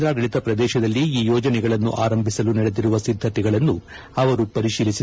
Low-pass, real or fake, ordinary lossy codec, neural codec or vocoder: none; real; none; none